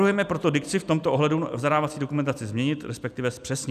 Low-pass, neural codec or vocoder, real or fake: 14.4 kHz; none; real